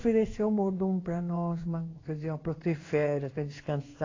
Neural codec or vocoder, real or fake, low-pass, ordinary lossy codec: codec, 16 kHz in and 24 kHz out, 1 kbps, XY-Tokenizer; fake; 7.2 kHz; AAC, 32 kbps